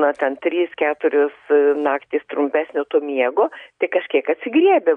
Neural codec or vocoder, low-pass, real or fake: none; 9.9 kHz; real